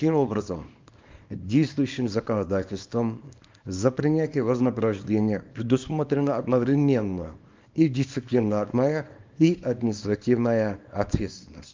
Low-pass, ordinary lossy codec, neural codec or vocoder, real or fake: 7.2 kHz; Opus, 24 kbps; codec, 24 kHz, 0.9 kbps, WavTokenizer, small release; fake